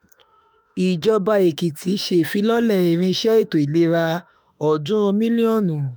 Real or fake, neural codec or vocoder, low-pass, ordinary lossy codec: fake; autoencoder, 48 kHz, 32 numbers a frame, DAC-VAE, trained on Japanese speech; none; none